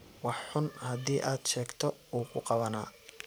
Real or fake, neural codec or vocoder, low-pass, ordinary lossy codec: fake; vocoder, 44.1 kHz, 128 mel bands every 512 samples, BigVGAN v2; none; none